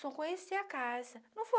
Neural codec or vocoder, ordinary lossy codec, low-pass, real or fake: none; none; none; real